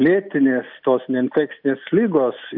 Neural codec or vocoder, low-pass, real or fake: none; 5.4 kHz; real